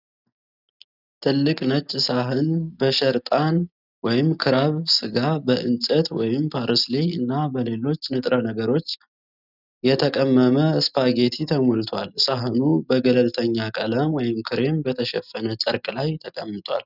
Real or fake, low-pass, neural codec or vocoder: real; 5.4 kHz; none